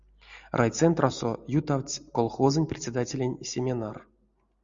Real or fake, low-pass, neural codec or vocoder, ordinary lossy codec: real; 7.2 kHz; none; Opus, 64 kbps